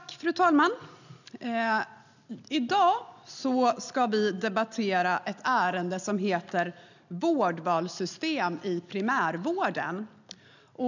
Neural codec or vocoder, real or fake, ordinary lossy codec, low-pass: none; real; none; 7.2 kHz